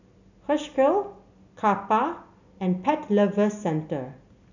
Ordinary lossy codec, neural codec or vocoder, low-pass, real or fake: none; none; 7.2 kHz; real